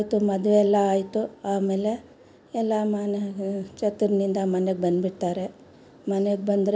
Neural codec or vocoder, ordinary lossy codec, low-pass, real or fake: none; none; none; real